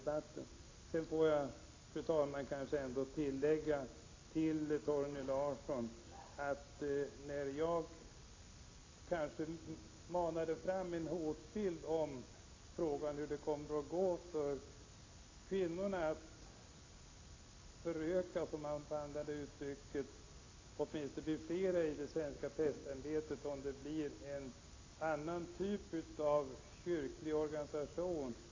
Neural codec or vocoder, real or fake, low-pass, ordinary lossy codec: none; real; 7.2 kHz; AAC, 32 kbps